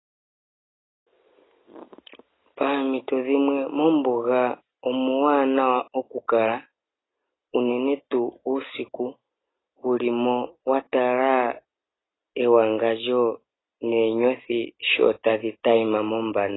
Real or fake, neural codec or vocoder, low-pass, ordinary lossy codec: real; none; 7.2 kHz; AAC, 16 kbps